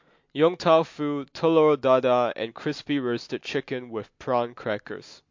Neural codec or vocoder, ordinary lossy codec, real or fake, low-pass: none; MP3, 48 kbps; real; 7.2 kHz